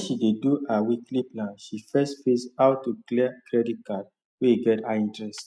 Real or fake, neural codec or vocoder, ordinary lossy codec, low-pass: real; none; none; none